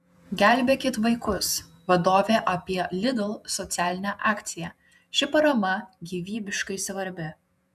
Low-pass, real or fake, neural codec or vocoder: 14.4 kHz; fake; vocoder, 48 kHz, 128 mel bands, Vocos